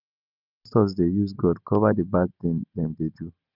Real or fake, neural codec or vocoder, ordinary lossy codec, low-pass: real; none; none; 5.4 kHz